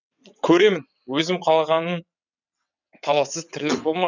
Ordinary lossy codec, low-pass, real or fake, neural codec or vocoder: none; 7.2 kHz; fake; vocoder, 22.05 kHz, 80 mel bands, WaveNeXt